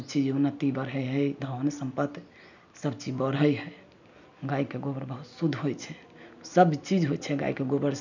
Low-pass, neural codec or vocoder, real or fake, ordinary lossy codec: 7.2 kHz; none; real; none